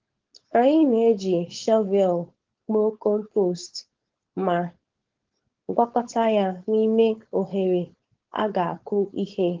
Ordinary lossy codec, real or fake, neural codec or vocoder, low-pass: Opus, 16 kbps; fake; codec, 16 kHz, 4.8 kbps, FACodec; 7.2 kHz